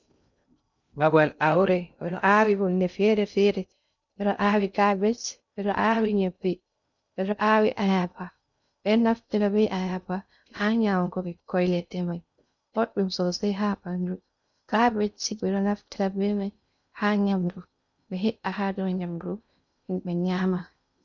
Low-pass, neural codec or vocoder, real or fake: 7.2 kHz; codec, 16 kHz in and 24 kHz out, 0.6 kbps, FocalCodec, streaming, 2048 codes; fake